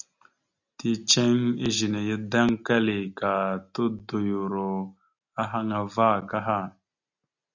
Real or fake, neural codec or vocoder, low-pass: real; none; 7.2 kHz